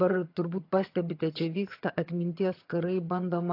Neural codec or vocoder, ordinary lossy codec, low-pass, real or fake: vocoder, 22.05 kHz, 80 mel bands, HiFi-GAN; AAC, 32 kbps; 5.4 kHz; fake